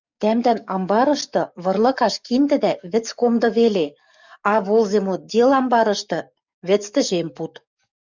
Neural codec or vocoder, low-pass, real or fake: codec, 44.1 kHz, 7.8 kbps, DAC; 7.2 kHz; fake